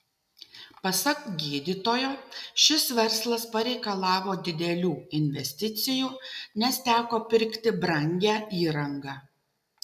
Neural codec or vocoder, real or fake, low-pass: none; real; 14.4 kHz